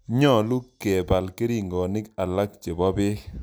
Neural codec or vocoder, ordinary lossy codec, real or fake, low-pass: none; none; real; none